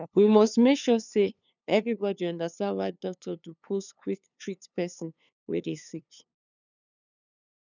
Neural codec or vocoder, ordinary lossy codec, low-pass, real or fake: codec, 16 kHz, 2 kbps, FunCodec, trained on LibriTTS, 25 frames a second; none; 7.2 kHz; fake